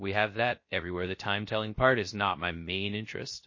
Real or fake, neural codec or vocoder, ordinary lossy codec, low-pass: fake; codec, 16 kHz, 0.3 kbps, FocalCodec; MP3, 32 kbps; 7.2 kHz